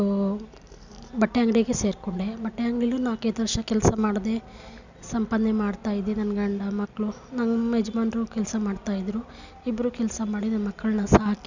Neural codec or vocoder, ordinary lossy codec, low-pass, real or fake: none; none; 7.2 kHz; real